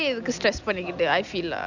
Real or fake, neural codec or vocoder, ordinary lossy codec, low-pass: real; none; none; 7.2 kHz